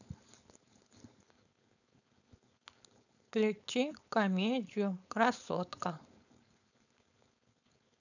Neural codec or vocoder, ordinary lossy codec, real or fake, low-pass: codec, 16 kHz, 4.8 kbps, FACodec; none; fake; 7.2 kHz